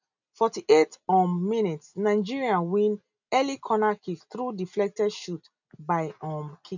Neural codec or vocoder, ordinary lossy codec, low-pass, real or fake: none; none; 7.2 kHz; real